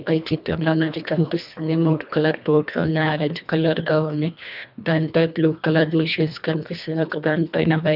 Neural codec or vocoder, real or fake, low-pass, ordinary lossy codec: codec, 24 kHz, 1.5 kbps, HILCodec; fake; 5.4 kHz; none